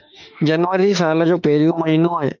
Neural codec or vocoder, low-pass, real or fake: autoencoder, 48 kHz, 32 numbers a frame, DAC-VAE, trained on Japanese speech; 7.2 kHz; fake